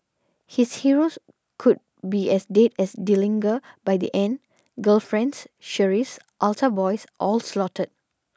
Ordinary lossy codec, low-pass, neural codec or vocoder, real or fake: none; none; none; real